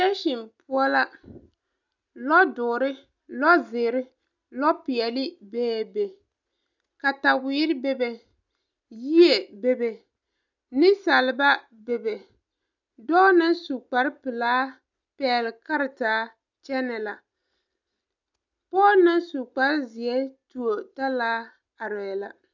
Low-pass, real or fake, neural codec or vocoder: 7.2 kHz; real; none